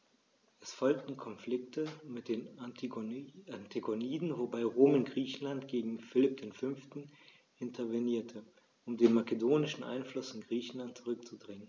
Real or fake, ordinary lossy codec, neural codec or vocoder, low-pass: real; none; none; none